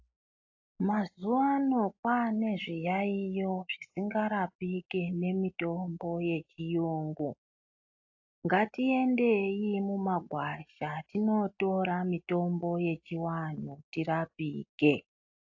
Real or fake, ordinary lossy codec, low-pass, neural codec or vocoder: real; AAC, 48 kbps; 7.2 kHz; none